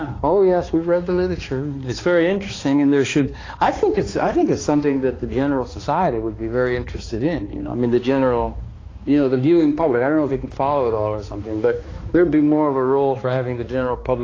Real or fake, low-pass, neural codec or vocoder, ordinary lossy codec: fake; 7.2 kHz; codec, 16 kHz, 2 kbps, X-Codec, HuBERT features, trained on balanced general audio; AAC, 32 kbps